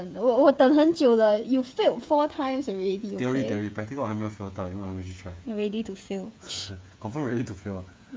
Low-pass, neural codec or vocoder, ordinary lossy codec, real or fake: none; codec, 16 kHz, 8 kbps, FreqCodec, smaller model; none; fake